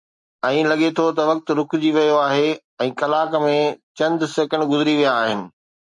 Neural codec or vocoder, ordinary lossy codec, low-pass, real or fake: none; MP3, 48 kbps; 10.8 kHz; real